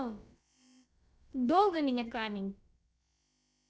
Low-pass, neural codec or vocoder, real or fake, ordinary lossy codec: none; codec, 16 kHz, about 1 kbps, DyCAST, with the encoder's durations; fake; none